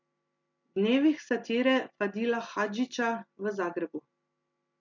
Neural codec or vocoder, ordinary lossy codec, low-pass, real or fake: none; MP3, 64 kbps; 7.2 kHz; real